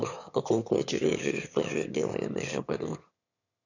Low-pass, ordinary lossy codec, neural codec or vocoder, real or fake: 7.2 kHz; none; autoencoder, 22.05 kHz, a latent of 192 numbers a frame, VITS, trained on one speaker; fake